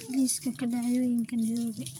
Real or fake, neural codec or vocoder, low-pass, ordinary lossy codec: fake; vocoder, 44.1 kHz, 128 mel bands, Pupu-Vocoder; 19.8 kHz; none